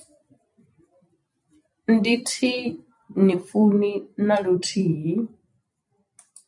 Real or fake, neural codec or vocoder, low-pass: fake; vocoder, 44.1 kHz, 128 mel bands every 256 samples, BigVGAN v2; 10.8 kHz